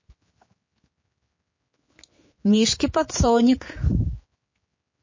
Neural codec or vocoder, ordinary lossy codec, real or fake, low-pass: codec, 16 kHz, 4 kbps, X-Codec, HuBERT features, trained on general audio; MP3, 32 kbps; fake; 7.2 kHz